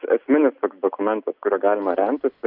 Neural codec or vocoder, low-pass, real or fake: none; 5.4 kHz; real